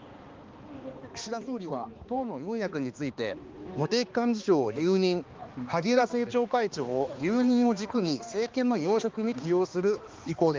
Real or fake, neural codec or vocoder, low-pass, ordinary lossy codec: fake; codec, 16 kHz, 2 kbps, X-Codec, HuBERT features, trained on balanced general audio; 7.2 kHz; Opus, 32 kbps